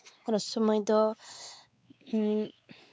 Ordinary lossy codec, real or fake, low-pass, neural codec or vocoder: none; fake; none; codec, 16 kHz, 2 kbps, X-Codec, WavLM features, trained on Multilingual LibriSpeech